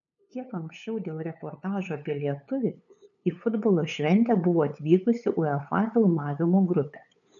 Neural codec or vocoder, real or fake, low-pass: codec, 16 kHz, 8 kbps, FunCodec, trained on LibriTTS, 25 frames a second; fake; 7.2 kHz